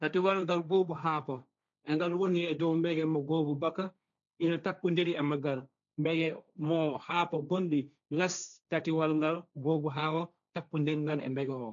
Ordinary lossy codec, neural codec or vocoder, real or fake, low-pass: none; codec, 16 kHz, 1.1 kbps, Voila-Tokenizer; fake; 7.2 kHz